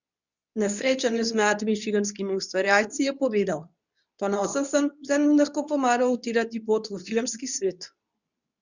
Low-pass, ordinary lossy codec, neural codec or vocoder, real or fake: 7.2 kHz; none; codec, 24 kHz, 0.9 kbps, WavTokenizer, medium speech release version 2; fake